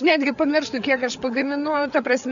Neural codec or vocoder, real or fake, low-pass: codec, 16 kHz, 8 kbps, FreqCodec, larger model; fake; 7.2 kHz